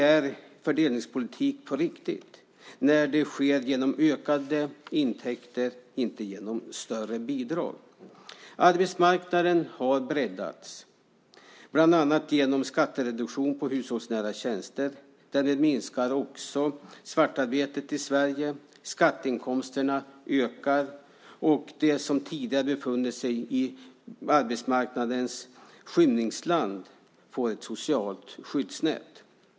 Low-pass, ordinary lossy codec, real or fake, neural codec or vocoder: none; none; real; none